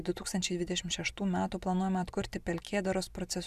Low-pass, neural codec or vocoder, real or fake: 14.4 kHz; none; real